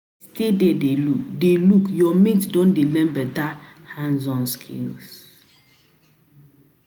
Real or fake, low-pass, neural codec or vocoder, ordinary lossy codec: real; none; none; none